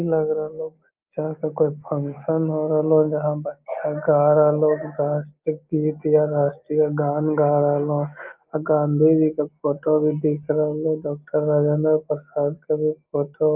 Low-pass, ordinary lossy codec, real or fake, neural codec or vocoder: 3.6 kHz; Opus, 24 kbps; real; none